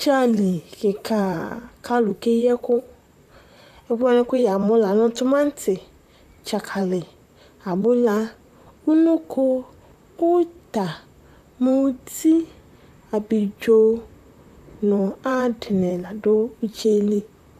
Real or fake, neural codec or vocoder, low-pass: fake; vocoder, 44.1 kHz, 128 mel bands, Pupu-Vocoder; 14.4 kHz